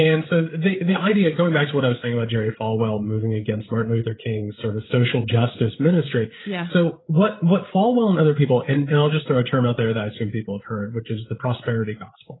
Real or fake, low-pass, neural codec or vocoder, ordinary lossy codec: fake; 7.2 kHz; codec, 16 kHz, 8 kbps, FreqCodec, larger model; AAC, 16 kbps